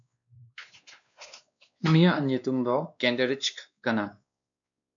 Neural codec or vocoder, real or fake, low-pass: codec, 16 kHz, 2 kbps, X-Codec, WavLM features, trained on Multilingual LibriSpeech; fake; 7.2 kHz